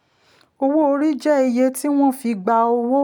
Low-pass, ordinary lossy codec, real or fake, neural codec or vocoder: 19.8 kHz; none; real; none